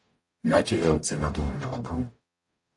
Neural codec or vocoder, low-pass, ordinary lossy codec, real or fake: codec, 44.1 kHz, 0.9 kbps, DAC; 10.8 kHz; AAC, 48 kbps; fake